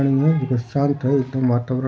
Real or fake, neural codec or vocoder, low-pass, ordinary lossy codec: real; none; none; none